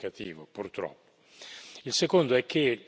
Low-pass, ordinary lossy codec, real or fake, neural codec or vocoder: none; none; real; none